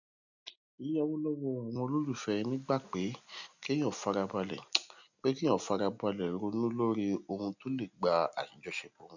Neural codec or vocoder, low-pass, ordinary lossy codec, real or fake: none; 7.2 kHz; none; real